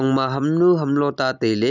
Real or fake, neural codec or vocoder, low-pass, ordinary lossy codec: real; none; 7.2 kHz; none